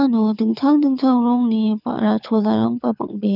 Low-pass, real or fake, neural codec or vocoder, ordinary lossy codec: 5.4 kHz; fake; codec, 24 kHz, 6 kbps, HILCodec; none